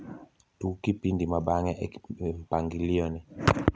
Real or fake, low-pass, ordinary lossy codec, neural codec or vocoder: real; none; none; none